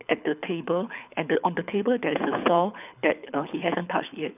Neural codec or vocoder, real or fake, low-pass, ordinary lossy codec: codec, 24 kHz, 6 kbps, HILCodec; fake; 3.6 kHz; none